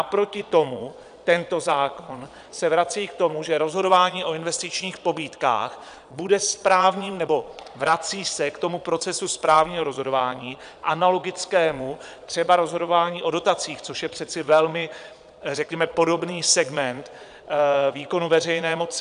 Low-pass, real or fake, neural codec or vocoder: 9.9 kHz; fake; vocoder, 22.05 kHz, 80 mel bands, Vocos